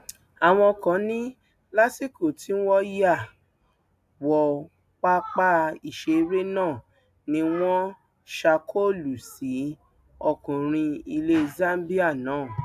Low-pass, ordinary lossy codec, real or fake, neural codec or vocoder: 14.4 kHz; AAC, 96 kbps; real; none